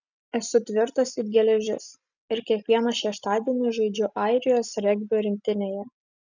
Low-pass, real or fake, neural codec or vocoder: 7.2 kHz; real; none